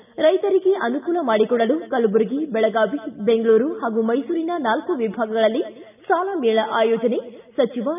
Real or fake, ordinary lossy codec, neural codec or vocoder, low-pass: real; none; none; 3.6 kHz